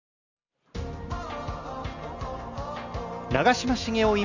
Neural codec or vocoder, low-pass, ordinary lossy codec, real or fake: none; 7.2 kHz; none; real